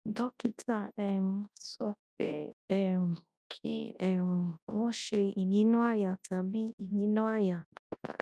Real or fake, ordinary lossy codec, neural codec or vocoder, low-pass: fake; none; codec, 24 kHz, 0.9 kbps, WavTokenizer, large speech release; none